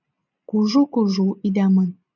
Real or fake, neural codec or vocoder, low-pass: real; none; 7.2 kHz